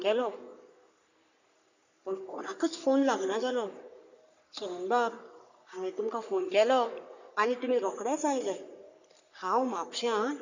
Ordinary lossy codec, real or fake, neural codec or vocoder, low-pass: none; fake; codec, 44.1 kHz, 3.4 kbps, Pupu-Codec; 7.2 kHz